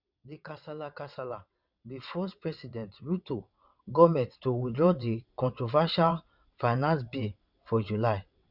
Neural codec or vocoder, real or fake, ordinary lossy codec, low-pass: vocoder, 22.05 kHz, 80 mel bands, WaveNeXt; fake; none; 5.4 kHz